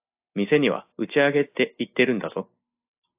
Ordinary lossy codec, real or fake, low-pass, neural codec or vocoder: AAC, 24 kbps; real; 3.6 kHz; none